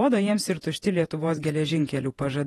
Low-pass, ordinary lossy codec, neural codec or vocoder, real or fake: 19.8 kHz; AAC, 32 kbps; vocoder, 48 kHz, 128 mel bands, Vocos; fake